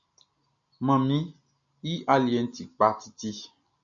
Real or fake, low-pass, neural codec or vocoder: real; 7.2 kHz; none